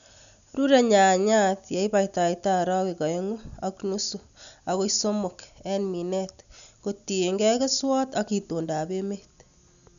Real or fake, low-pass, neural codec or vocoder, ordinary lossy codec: real; 7.2 kHz; none; none